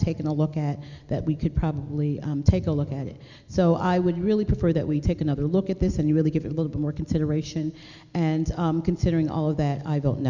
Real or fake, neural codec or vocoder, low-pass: real; none; 7.2 kHz